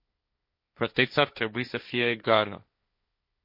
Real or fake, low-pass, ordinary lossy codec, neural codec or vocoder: fake; 5.4 kHz; MP3, 32 kbps; codec, 16 kHz, 1.1 kbps, Voila-Tokenizer